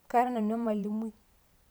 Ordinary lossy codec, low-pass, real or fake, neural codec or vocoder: none; none; fake; vocoder, 44.1 kHz, 128 mel bands every 512 samples, BigVGAN v2